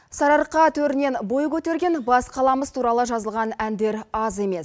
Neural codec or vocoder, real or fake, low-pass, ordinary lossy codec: none; real; none; none